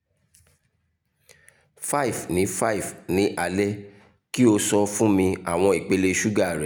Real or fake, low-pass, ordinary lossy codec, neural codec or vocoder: real; none; none; none